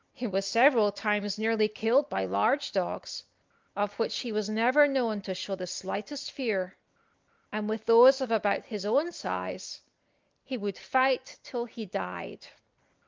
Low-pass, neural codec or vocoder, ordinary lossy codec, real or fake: 7.2 kHz; none; Opus, 32 kbps; real